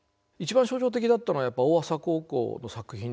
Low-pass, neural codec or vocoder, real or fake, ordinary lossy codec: none; none; real; none